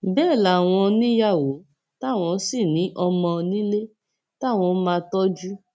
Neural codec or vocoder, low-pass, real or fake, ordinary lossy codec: none; none; real; none